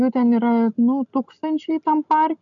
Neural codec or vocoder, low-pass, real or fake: none; 7.2 kHz; real